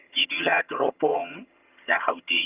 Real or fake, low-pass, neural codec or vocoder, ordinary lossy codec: fake; 3.6 kHz; vocoder, 22.05 kHz, 80 mel bands, HiFi-GAN; Opus, 24 kbps